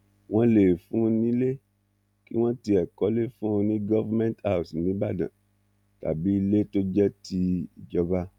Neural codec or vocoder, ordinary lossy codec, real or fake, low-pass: none; none; real; 19.8 kHz